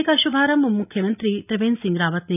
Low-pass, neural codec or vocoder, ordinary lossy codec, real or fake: 3.6 kHz; none; none; real